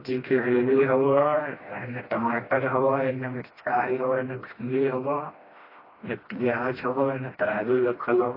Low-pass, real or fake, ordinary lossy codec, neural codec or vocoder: 5.4 kHz; fake; AAC, 24 kbps; codec, 16 kHz, 1 kbps, FreqCodec, smaller model